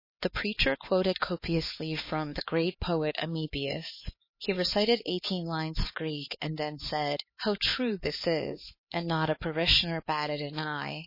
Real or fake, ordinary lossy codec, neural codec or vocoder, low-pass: fake; MP3, 24 kbps; codec, 16 kHz, 4 kbps, X-Codec, HuBERT features, trained on LibriSpeech; 5.4 kHz